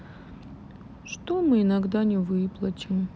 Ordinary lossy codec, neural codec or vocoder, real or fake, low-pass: none; none; real; none